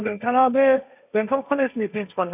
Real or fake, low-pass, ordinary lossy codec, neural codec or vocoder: fake; 3.6 kHz; none; codec, 16 kHz, 1.1 kbps, Voila-Tokenizer